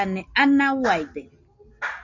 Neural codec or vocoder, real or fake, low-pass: none; real; 7.2 kHz